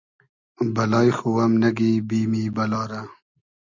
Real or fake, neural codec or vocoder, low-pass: real; none; 7.2 kHz